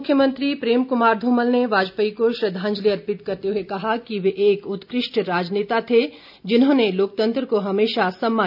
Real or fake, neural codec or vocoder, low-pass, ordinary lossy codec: real; none; 5.4 kHz; none